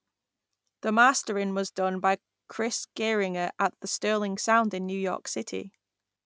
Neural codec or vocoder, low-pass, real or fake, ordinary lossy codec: none; none; real; none